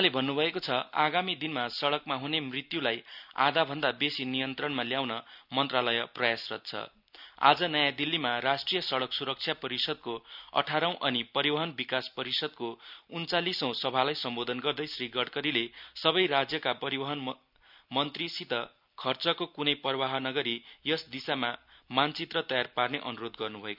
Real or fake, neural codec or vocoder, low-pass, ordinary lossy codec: real; none; 5.4 kHz; none